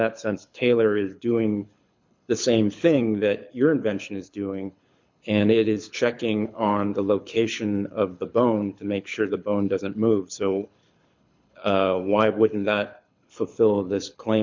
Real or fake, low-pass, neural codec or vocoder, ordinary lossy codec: fake; 7.2 kHz; codec, 24 kHz, 6 kbps, HILCodec; MP3, 64 kbps